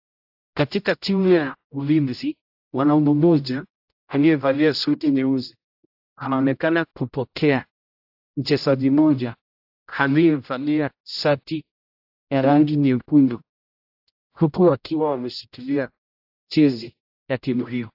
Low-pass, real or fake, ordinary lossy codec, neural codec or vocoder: 5.4 kHz; fake; AAC, 48 kbps; codec, 16 kHz, 0.5 kbps, X-Codec, HuBERT features, trained on general audio